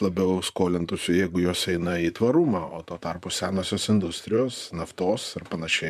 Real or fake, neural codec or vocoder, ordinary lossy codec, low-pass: fake; vocoder, 44.1 kHz, 128 mel bands, Pupu-Vocoder; MP3, 96 kbps; 14.4 kHz